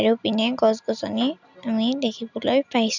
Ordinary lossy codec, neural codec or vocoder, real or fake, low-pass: none; none; real; 7.2 kHz